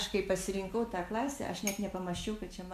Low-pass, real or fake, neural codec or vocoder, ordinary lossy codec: 14.4 kHz; real; none; MP3, 64 kbps